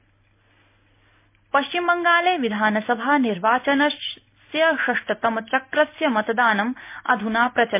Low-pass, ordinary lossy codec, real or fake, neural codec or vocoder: 3.6 kHz; MP3, 24 kbps; real; none